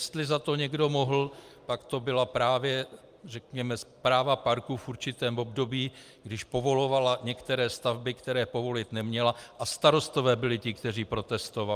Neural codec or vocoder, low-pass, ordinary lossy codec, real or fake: none; 14.4 kHz; Opus, 32 kbps; real